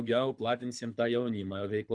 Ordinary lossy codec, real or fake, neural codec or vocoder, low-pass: MP3, 96 kbps; fake; codec, 24 kHz, 6 kbps, HILCodec; 9.9 kHz